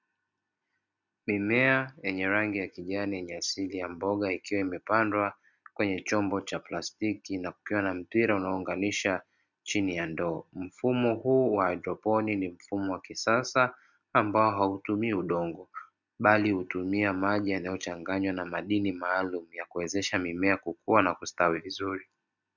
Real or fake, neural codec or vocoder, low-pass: real; none; 7.2 kHz